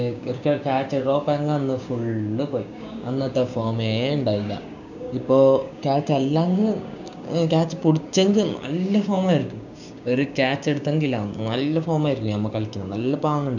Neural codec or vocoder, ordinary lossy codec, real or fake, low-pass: codec, 16 kHz, 6 kbps, DAC; none; fake; 7.2 kHz